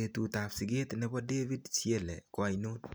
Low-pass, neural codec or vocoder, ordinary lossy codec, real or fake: none; none; none; real